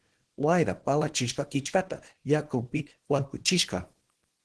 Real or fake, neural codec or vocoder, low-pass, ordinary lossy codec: fake; codec, 24 kHz, 0.9 kbps, WavTokenizer, small release; 10.8 kHz; Opus, 16 kbps